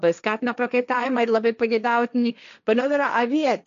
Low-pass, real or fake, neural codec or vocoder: 7.2 kHz; fake; codec, 16 kHz, 1.1 kbps, Voila-Tokenizer